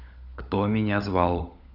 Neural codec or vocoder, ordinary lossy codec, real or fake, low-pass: codec, 16 kHz, 16 kbps, FunCodec, trained on LibriTTS, 50 frames a second; none; fake; 5.4 kHz